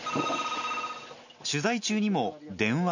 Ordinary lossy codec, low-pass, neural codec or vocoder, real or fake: none; 7.2 kHz; none; real